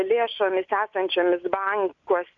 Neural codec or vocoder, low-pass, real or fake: none; 7.2 kHz; real